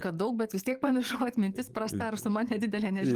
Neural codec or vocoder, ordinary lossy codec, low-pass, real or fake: codec, 44.1 kHz, 7.8 kbps, DAC; Opus, 24 kbps; 14.4 kHz; fake